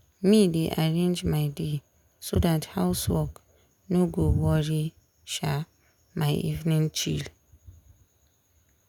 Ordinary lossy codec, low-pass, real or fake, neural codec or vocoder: none; 19.8 kHz; real; none